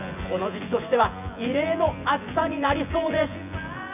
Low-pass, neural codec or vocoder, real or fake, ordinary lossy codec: 3.6 kHz; vocoder, 24 kHz, 100 mel bands, Vocos; fake; none